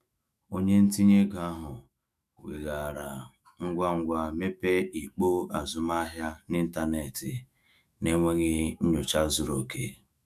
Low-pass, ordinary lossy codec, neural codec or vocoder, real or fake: 14.4 kHz; none; autoencoder, 48 kHz, 128 numbers a frame, DAC-VAE, trained on Japanese speech; fake